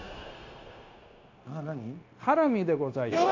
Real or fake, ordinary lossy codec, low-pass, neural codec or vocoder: fake; AAC, 48 kbps; 7.2 kHz; codec, 16 kHz, 0.9 kbps, LongCat-Audio-Codec